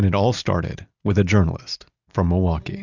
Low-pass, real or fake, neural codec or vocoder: 7.2 kHz; real; none